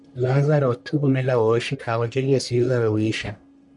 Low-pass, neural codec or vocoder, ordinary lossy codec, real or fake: 10.8 kHz; codec, 44.1 kHz, 1.7 kbps, Pupu-Codec; MP3, 96 kbps; fake